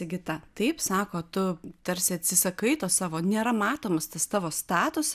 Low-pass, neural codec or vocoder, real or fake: 14.4 kHz; none; real